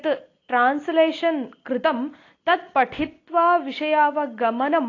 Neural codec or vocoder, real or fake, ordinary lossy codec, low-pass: none; real; AAC, 32 kbps; 7.2 kHz